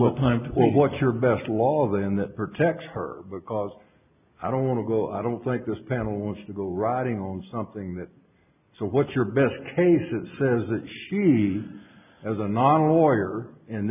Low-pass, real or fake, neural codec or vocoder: 3.6 kHz; real; none